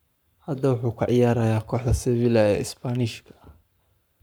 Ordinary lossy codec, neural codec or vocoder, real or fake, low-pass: none; codec, 44.1 kHz, 7.8 kbps, Pupu-Codec; fake; none